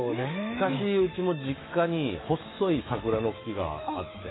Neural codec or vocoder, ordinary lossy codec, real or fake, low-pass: autoencoder, 48 kHz, 128 numbers a frame, DAC-VAE, trained on Japanese speech; AAC, 16 kbps; fake; 7.2 kHz